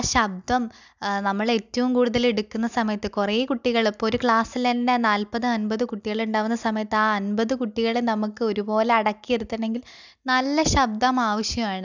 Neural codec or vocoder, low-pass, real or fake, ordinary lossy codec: none; 7.2 kHz; real; none